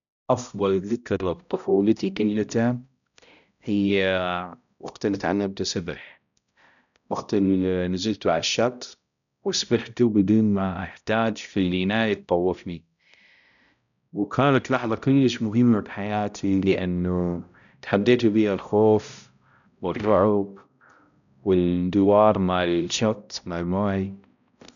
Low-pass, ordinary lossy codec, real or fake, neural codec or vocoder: 7.2 kHz; none; fake; codec, 16 kHz, 0.5 kbps, X-Codec, HuBERT features, trained on balanced general audio